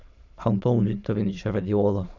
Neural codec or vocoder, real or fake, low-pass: autoencoder, 22.05 kHz, a latent of 192 numbers a frame, VITS, trained on many speakers; fake; 7.2 kHz